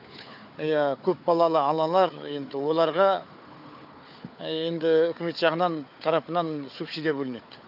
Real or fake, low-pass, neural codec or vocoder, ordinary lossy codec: fake; 5.4 kHz; codec, 16 kHz, 4 kbps, FunCodec, trained on Chinese and English, 50 frames a second; none